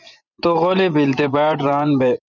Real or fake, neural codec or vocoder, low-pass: fake; vocoder, 24 kHz, 100 mel bands, Vocos; 7.2 kHz